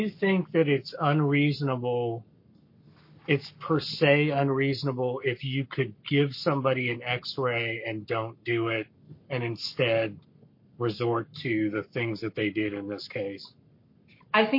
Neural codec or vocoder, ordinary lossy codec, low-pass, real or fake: codec, 44.1 kHz, 7.8 kbps, Pupu-Codec; MP3, 32 kbps; 5.4 kHz; fake